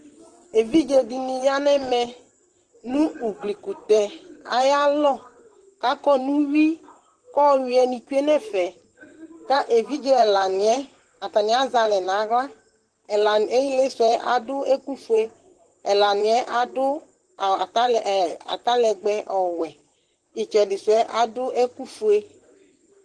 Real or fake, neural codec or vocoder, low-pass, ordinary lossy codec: fake; vocoder, 44.1 kHz, 128 mel bands, Pupu-Vocoder; 10.8 kHz; Opus, 16 kbps